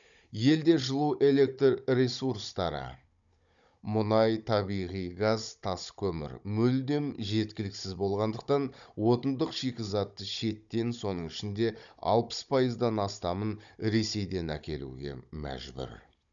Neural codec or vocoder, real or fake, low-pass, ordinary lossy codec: codec, 16 kHz, 16 kbps, FunCodec, trained on Chinese and English, 50 frames a second; fake; 7.2 kHz; none